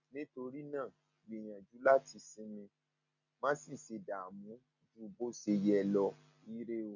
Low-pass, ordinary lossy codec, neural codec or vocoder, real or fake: 7.2 kHz; none; none; real